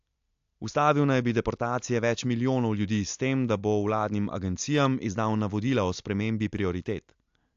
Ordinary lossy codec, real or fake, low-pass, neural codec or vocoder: AAC, 64 kbps; real; 7.2 kHz; none